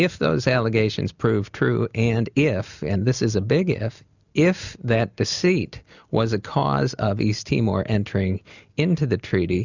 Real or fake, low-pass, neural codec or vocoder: real; 7.2 kHz; none